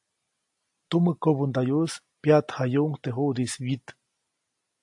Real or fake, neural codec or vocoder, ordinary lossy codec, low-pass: real; none; AAC, 64 kbps; 10.8 kHz